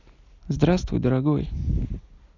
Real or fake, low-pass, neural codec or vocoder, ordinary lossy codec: real; 7.2 kHz; none; none